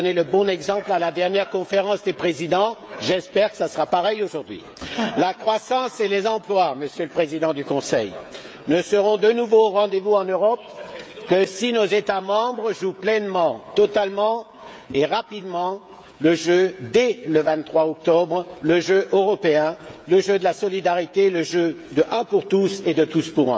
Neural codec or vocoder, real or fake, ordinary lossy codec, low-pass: codec, 16 kHz, 16 kbps, FreqCodec, smaller model; fake; none; none